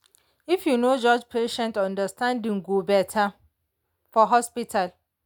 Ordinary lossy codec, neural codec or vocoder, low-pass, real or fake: none; none; 19.8 kHz; real